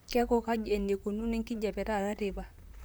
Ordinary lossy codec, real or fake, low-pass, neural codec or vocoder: none; fake; none; vocoder, 44.1 kHz, 128 mel bands, Pupu-Vocoder